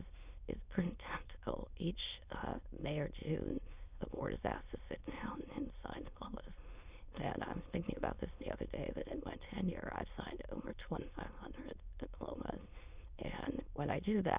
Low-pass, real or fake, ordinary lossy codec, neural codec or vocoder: 3.6 kHz; fake; Opus, 64 kbps; autoencoder, 22.05 kHz, a latent of 192 numbers a frame, VITS, trained on many speakers